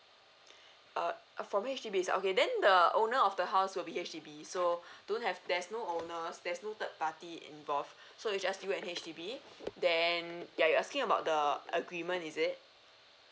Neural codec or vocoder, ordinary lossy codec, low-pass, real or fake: none; none; none; real